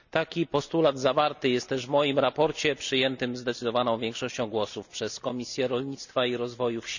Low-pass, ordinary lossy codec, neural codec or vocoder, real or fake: 7.2 kHz; none; none; real